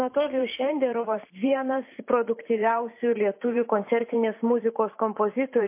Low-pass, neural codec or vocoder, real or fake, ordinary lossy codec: 3.6 kHz; vocoder, 44.1 kHz, 128 mel bands every 512 samples, BigVGAN v2; fake; MP3, 24 kbps